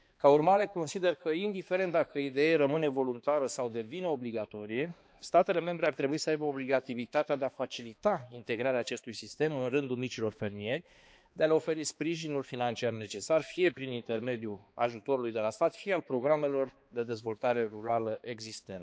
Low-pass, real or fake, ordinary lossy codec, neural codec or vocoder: none; fake; none; codec, 16 kHz, 2 kbps, X-Codec, HuBERT features, trained on balanced general audio